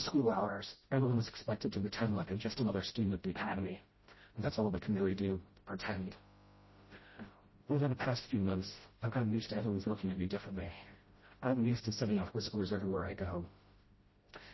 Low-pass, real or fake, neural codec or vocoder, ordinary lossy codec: 7.2 kHz; fake; codec, 16 kHz, 0.5 kbps, FreqCodec, smaller model; MP3, 24 kbps